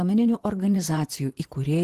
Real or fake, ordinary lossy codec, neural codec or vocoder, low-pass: fake; Opus, 16 kbps; vocoder, 44.1 kHz, 128 mel bands, Pupu-Vocoder; 14.4 kHz